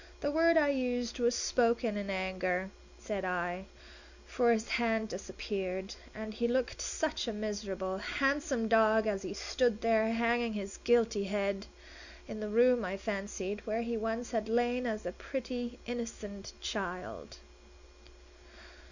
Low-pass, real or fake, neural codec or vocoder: 7.2 kHz; real; none